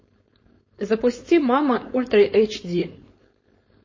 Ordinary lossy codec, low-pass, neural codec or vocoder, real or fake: MP3, 32 kbps; 7.2 kHz; codec, 16 kHz, 4.8 kbps, FACodec; fake